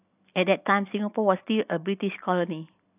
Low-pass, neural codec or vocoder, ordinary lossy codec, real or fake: 3.6 kHz; none; AAC, 32 kbps; real